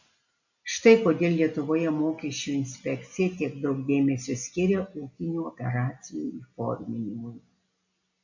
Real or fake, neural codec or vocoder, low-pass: real; none; 7.2 kHz